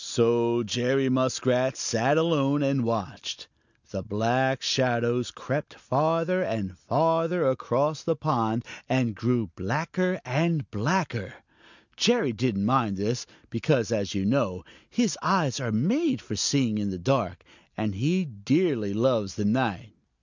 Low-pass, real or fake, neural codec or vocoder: 7.2 kHz; real; none